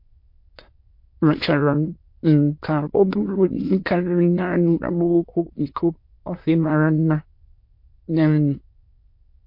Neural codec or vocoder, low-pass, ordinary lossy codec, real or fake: autoencoder, 22.05 kHz, a latent of 192 numbers a frame, VITS, trained on many speakers; 5.4 kHz; MP3, 32 kbps; fake